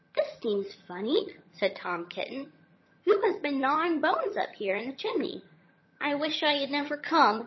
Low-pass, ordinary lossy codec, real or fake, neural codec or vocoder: 7.2 kHz; MP3, 24 kbps; fake; vocoder, 22.05 kHz, 80 mel bands, HiFi-GAN